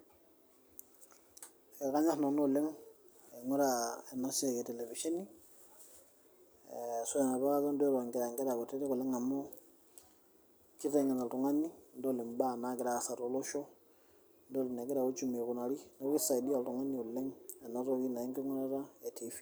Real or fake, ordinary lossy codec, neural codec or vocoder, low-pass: real; none; none; none